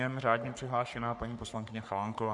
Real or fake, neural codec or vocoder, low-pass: fake; codec, 44.1 kHz, 3.4 kbps, Pupu-Codec; 10.8 kHz